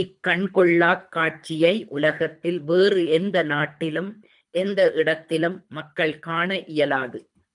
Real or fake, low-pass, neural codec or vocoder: fake; 10.8 kHz; codec, 24 kHz, 3 kbps, HILCodec